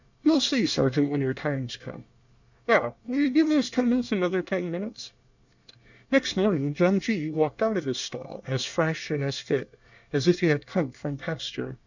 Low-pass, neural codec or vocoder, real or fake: 7.2 kHz; codec, 24 kHz, 1 kbps, SNAC; fake